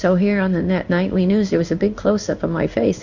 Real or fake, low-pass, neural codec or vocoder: fake; 7.2 kHz; codec, 16 kHz in and 24 kHz out, 1 kbps, XY-Tokenizer